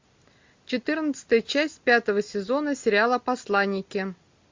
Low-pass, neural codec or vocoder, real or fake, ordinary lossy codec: 7.2 kHz; none; real; MP3, 48 kbps